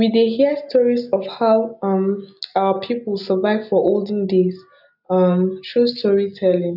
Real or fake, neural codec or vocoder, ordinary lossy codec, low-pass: real; none; none; 5.4 kHz